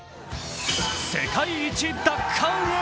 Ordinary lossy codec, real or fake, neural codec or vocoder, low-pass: none; real; none; none